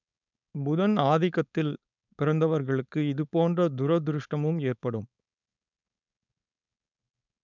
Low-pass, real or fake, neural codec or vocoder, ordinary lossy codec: 7.2 kHz; fake; codec, 16 kHz, 4.8 kbps, FACodec; none